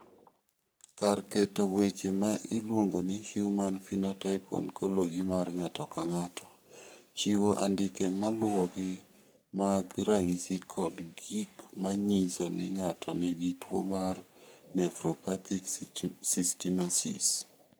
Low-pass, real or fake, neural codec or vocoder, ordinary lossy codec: none; fake; codec, 44.1 kHz, 3.4 kbps, Pupu-Codec; none